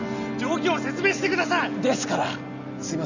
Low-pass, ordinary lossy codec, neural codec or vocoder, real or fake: 7.2 kHz; none; none; real